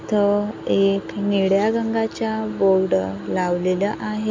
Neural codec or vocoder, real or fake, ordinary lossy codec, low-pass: none; real; MP3, 64 kbps; 7.2 kHz